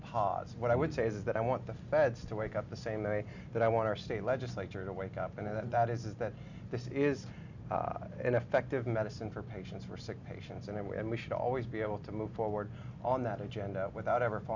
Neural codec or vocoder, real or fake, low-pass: none; real; 7.2 kHz